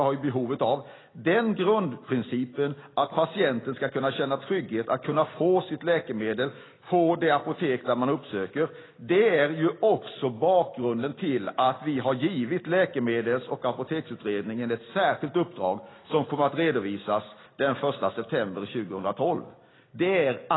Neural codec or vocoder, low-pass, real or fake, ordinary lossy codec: none; 7.2 kHz; real; AAC, 16 kbps